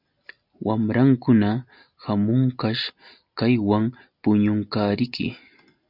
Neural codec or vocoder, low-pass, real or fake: none; 5.4 kHz; real